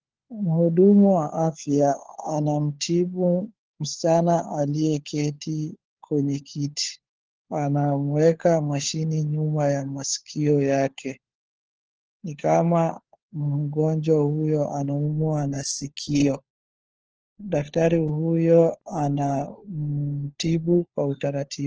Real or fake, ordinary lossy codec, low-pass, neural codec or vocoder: fake; Opus, 16 kbps; 7.2 kHz; codec, 16 kHz, 4 kbps, FunCodec, trained on LibriTTS, 50 frames a second